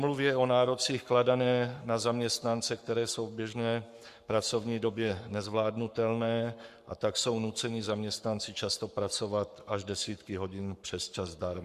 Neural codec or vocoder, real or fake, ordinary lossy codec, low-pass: codec, 44.1 kHz, 7.8 kbps, Pupu-Codec; fake; Opus, 64 kbps; 14.4 kHz